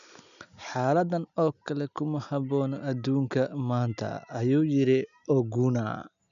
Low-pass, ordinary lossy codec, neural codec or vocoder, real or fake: 7.2 kHz; none; none; real